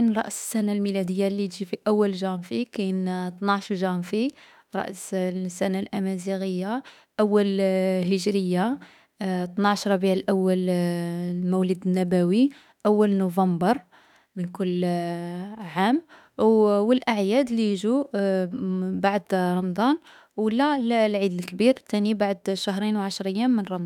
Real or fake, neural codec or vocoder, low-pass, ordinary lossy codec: fake; autoencoder, 48 kHz, 32 numbers a frame, DAC-VAE, trained on Japanese speech; 19.8 kHz; none